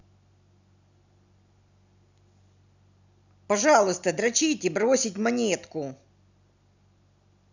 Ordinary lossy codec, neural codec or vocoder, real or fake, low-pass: none; none; real; 7.2 kHz